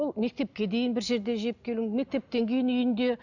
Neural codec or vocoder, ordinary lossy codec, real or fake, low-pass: none; none; real; 7.2 kHz